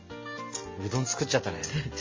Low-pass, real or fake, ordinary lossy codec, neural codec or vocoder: 7.2 kHz; real; MP3, 32 kbps; none